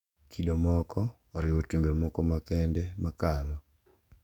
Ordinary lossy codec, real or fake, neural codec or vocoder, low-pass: none; fake; codec, 44.1 kHz, 7.8 kbps, DAC; 19.8 kHz